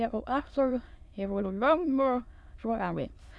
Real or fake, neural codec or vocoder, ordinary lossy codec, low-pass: fake; autoencoder, 22.05 kHz, a latent of 192 numbers a frame, VITS, trained on many speakers; none; none